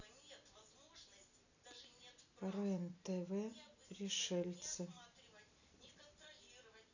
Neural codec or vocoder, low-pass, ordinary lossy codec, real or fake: none; 7.2 kHz; none; real